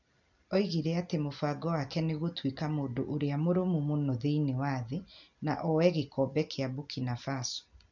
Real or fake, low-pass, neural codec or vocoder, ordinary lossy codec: real; 7.2 kHz; none; none